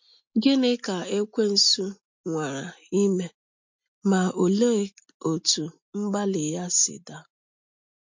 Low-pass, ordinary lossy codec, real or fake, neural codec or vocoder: 7.2 kHz; MP3, 48 kbps; real; none